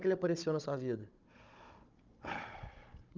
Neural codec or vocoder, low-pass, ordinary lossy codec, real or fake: codec, 16 kHz, 16 kbps, FunCodec, trained on Chinese and English, 50 frames a second; 7.2 kHz; Opus, 24 kbps; fake